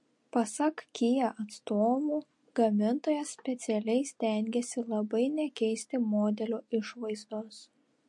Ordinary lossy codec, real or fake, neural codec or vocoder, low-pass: MP3, 48 kbps; real; none; 10.8 kHz